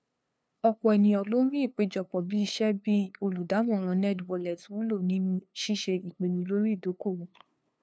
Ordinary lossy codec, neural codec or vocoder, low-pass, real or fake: none; codec, 16 kHz, 2 kbps, FunCodec, trained on LibriTTS, 25 frames a second; none; fake